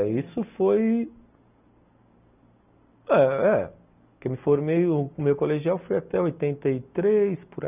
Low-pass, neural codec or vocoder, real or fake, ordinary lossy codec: 3.6 kHz; none; real; none